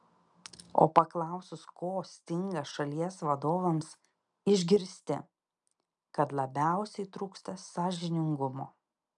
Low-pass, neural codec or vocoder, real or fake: 10.8 kHz; none; real